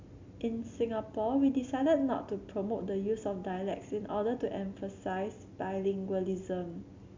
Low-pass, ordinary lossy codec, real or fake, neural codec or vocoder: 7.2 kHz; MP3, 64 kbps; real; none